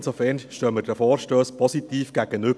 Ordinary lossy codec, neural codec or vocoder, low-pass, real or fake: none; none; none; real